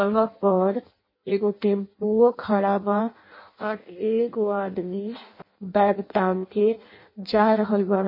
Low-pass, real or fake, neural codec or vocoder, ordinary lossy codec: 5.4 kHz; fake; codec, 16 kHz in and 24 kHz out, 0.6 kbps, FireRedTTS-2 codec; MP3, 24 kbps